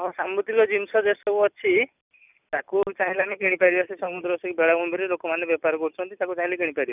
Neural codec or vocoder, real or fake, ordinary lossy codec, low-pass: none; real; none; 3.6 kHz